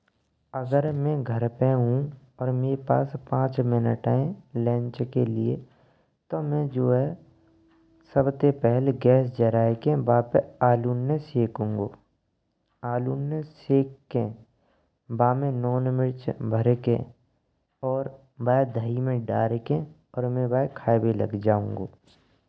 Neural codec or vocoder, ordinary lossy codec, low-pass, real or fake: none; none; none; real